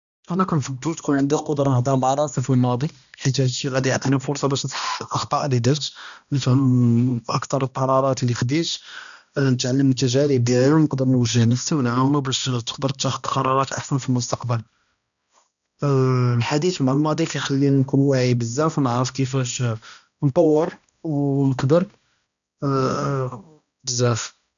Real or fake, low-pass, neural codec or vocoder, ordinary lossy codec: fake; 7.2 kHz; codec, 16 kHz, 1 kbps, X-Codec, HuBERT features, trained on balanced general audio; none